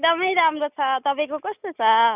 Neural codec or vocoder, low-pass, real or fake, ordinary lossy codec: none; 3.6 kHz; real; none